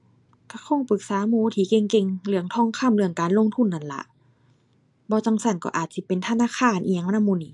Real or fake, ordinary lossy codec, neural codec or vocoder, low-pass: real; AAC, 64 kbps; none; 10.8 kHz